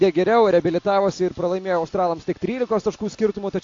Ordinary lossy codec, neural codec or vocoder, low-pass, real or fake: AAC, 48 kbps; none; 7.2 kHz; real